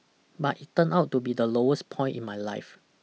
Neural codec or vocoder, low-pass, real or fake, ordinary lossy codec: none; none; real; none